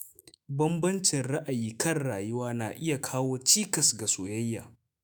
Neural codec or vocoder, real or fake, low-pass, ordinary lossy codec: autoencoder, 48 kHz, 128 numbers a frame, DAC-VAE, trained on Japanese speech; fake; none; none